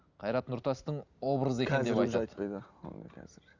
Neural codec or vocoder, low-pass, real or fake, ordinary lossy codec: none; 7.2 kHz; real; Opus, 64 kbps